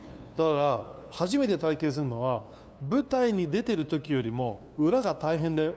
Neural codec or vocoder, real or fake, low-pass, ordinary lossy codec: codec, 16 kHz, 2 kbps, FunCodec, trained on LibriTTS, 25 frames a second; fake; none; none